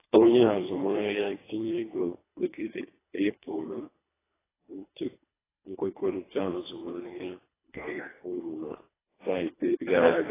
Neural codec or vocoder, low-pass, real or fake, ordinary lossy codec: codec, 24 kHz, 1.5 kbps, HILCodec; 3.6 kHz; fake; AAC, 16 kbps